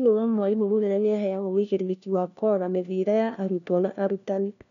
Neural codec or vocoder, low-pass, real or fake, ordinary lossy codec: codec, 16 kHz, 1 kbps, FunCodec, trained on LibriTTS, 50 frames a second; 7.2 kHz; fake; none